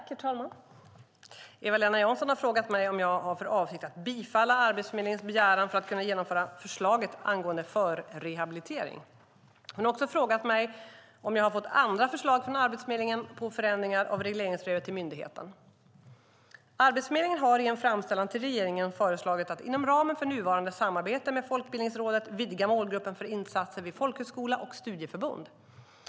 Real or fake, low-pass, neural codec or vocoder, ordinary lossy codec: real; none; none; none